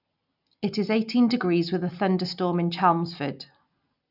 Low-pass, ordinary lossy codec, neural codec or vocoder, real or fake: 5.4 kHz; none; none; real